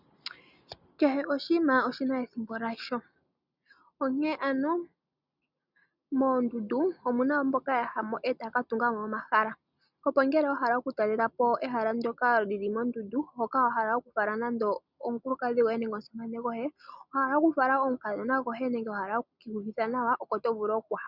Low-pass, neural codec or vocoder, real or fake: 5.4 kHz; none; real